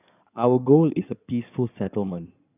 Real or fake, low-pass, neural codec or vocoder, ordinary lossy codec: fake; 3.6 kHz; codec, 16 kHz in and 24 kHz out, 2.2 kbps, FireRedTTS-2 codec; none